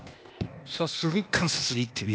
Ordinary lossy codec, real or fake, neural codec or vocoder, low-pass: none; fake; codec, 16 kHz, 0.8 kbps, ZipCodec; none